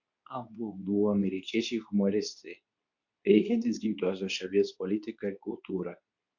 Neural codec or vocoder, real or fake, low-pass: codec, 24 kHz, 0.9 kbps, WavTokenizer, medium speech release version 2; fake; 7.2 kHz